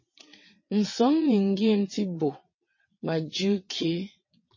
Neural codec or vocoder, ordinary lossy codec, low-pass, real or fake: vocoder, 22.05 kHz, 80 mel bands, WaveNeXt; MP3, 32 kbps; 7.2 kHz; fake